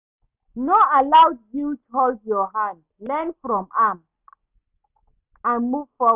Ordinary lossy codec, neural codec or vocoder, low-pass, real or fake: none; none; 3.6 kHz; real